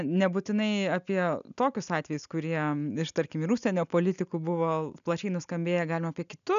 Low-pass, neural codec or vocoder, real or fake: 7.2 kHz; none; real